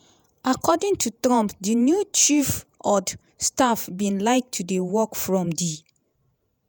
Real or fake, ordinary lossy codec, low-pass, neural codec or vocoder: fake; none; none; vocoder, 48 kHz, 128 mel bands, Vocos